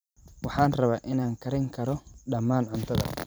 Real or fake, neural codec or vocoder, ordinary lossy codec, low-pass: fake; vocoder, 44.1 kHz, 128 mel bands every 256 samples, BigVGAN v2; none; none